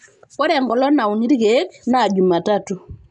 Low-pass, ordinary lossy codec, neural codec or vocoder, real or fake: 10.8 kHz; none; none; real